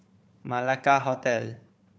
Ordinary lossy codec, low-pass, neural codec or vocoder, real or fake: none; none; codec, 16 kHz, 4 kbps, FunCodec, trained on Chinese and English, 50 frames a second; fake